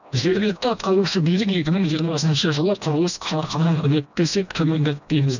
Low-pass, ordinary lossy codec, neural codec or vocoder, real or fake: 7.2 kHz; none; codec, 16 kHz, 1 kbps, FreqCodec, smaller model; fake